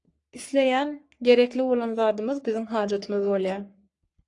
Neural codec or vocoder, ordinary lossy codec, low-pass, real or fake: codec, 44.1 kHz, 3.4 kbps, Pupu-Codec; AAC, 48 kbps; 10.8 kHz; fake